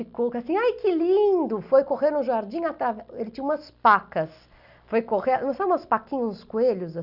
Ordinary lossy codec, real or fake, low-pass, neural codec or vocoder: none; real; 5.4 kHz; none